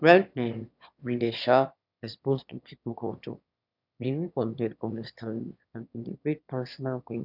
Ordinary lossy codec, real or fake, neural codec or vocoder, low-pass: none; fake; autoencoder, 22.05 kHz, a latent of 192 numbers a frame, VITS, trained on one speaker; 5.4 kHz